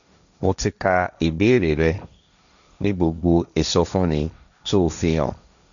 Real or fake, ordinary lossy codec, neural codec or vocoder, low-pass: fake; none; codec, 16 kHz, 1.1 kbps, Voila-Tokenizer; 7.2 kHz